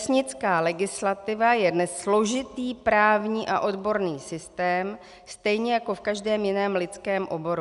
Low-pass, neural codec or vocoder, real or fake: 10.8 kHz; none; real